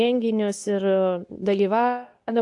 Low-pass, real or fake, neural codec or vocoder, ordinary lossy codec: 10.8 kHz; fake; codec, 24 kHz, 0.9 kbps, WavTokenizer, medium speech release version 2; AAC, 64 kbps